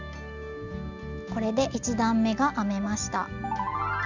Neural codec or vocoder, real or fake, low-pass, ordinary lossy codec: none; real; 7.2 kHz; none